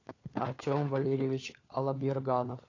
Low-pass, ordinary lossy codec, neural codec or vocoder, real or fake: 7.2 kHz; AAC, 32 kbps; codec, 16 kHz, 4 kbps, FunCodec, trained on LibriTTS, 50 frames a second; fake